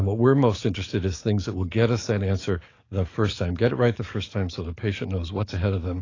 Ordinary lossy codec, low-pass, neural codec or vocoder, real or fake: AAC, 32 kbps; 7.2 kHz; codec, 44.1 kHz, 7.8 kbps, DAC; fake